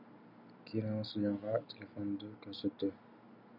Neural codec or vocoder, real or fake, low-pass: none; real; 5.4 kHz